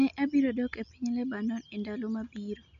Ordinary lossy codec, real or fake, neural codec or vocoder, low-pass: AAC, 96 kbps; real; none; 7.2 kHz